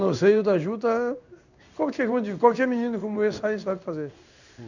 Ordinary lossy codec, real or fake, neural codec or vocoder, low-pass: none; fake; codec, 16 kHz in and 24 kHz out, 1 kbps, XY-Tokenizer; 7.2 kHz